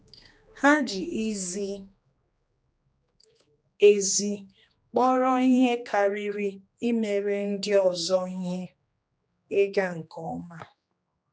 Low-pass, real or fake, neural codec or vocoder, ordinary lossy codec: none; fake; codec, 16 kHz, 2 kbps, X-Codec, HuBERT features, trained on general audio; none